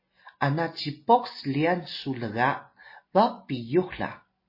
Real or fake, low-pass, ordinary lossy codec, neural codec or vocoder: real; 5.4 kHz; MP3, 24 kbps; none